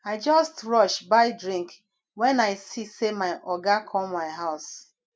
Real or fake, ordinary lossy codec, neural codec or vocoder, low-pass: real; none; none; none